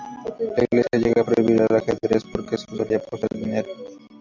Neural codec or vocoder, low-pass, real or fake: none; 7.2 kHz; real